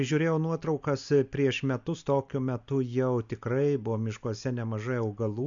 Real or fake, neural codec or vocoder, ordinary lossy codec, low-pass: real; none; MP3, 64 kbps; 7.2 kHz